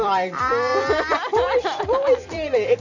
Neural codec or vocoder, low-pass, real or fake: vocoder, 44.1 kHz, 128 mel bands every 256 samples, BigVGAN v2; 7.2 kHz; fake